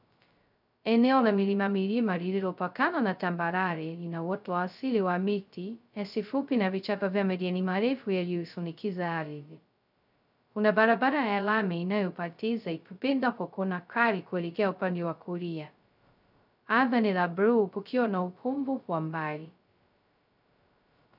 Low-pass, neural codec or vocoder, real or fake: 5.4 kHz; codec, 16 kHz, 0.2 kbps, FocalCodec; fake